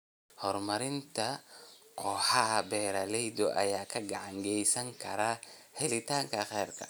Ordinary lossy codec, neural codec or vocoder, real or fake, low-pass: none; none; real; none